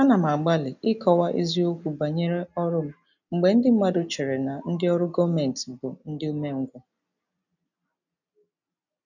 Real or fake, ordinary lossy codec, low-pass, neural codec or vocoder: real; none; 7.2 kHz; none